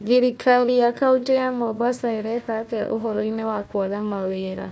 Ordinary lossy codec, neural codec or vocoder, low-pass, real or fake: none; codec, 16 kHz, 1 kbps, FunCodec, trained on Chinese and English, 50 frames a second; none; fake